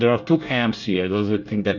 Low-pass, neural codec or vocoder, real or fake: 7.2 kHz; codec, 24 kHz, 1 kbps, SNAC; fake